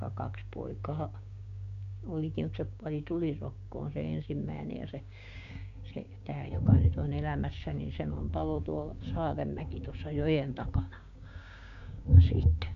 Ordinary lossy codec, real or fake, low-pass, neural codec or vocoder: MP3, 64 kbps; fake; 7.2 kHz; codec, 16 kHz, 6 kbps, DAC